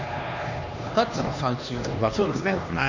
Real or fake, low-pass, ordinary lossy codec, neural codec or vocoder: fake; 7.2 kHz; none; codec, 16 kHz, 2 kbps, X-Codec, HuBERT features, trained on LibriSpeech